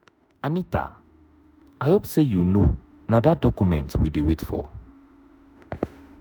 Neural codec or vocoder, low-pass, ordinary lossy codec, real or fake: autoencoder, 48 kHz, 32 numbers a frame, DAC-VAE, trained on Japanese speech; none; none; fake